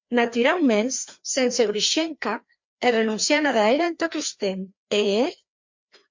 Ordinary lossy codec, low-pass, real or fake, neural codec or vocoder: MP3, 64 kbps; 7.2 kHz; fake; codec, 16 kHz, 2 kbps, FreqCodec, larger model